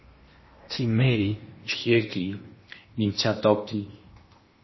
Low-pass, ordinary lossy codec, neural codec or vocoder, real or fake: 7.2 kHz; MP3, 24 kbps; codec, 16 kHz in and 24 kHz out, 0.8 kbps, FocalCodec, streaming, 65536 codes; fake